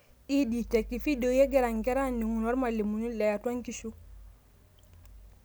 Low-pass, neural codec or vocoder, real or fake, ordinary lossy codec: none; none; real; none